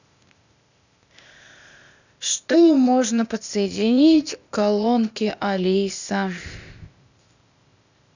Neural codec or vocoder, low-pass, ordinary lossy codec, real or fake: codec, 16 kHz, 0.8 kbps, ZipCodec; 7.2 kHz; none; fake